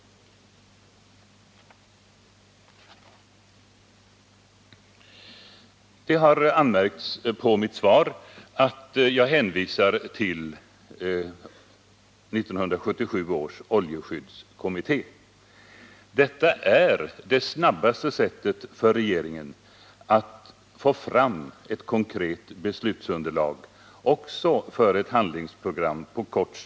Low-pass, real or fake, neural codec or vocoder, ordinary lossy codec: none; real; none; none